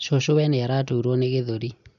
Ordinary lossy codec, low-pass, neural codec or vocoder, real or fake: none; 7.2 kHz; none; real